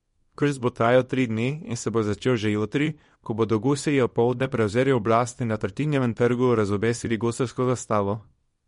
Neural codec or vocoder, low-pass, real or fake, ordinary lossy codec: codec, 24 kHz, 0.9 kbps, WavTokenizer, small release; 10.8 kHz; fake; MP3, 48 kbps